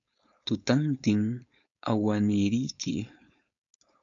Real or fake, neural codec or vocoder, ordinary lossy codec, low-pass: fake; codec, 16 kHz, 4.8 kbps, FACodec; MP3, 96 kbps; 7.2 kHz